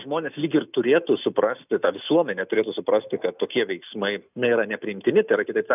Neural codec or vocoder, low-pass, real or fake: none; 3.6 kHz; real